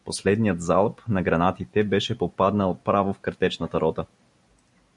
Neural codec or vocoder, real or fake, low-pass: none; real; 10.8 kHz